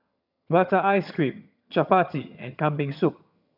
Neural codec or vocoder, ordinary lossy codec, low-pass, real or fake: vocoder, 22.05 kHz, 80 mel bands, HiFi-GAN; none; 5.4 kHz; fake